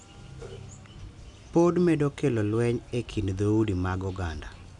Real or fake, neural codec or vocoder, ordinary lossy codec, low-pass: real; none; none; 10.8 kHz